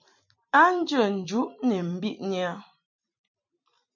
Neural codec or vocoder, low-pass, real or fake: vocoder, 44.1 kHz, 128 mel bands every 256 samples, BigVGAN v2; 7.2 kHz; fake